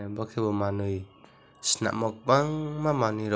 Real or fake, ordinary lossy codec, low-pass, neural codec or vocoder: real; none; none; none